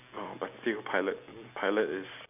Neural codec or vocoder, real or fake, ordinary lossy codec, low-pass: none; real; none; 3.6 kHz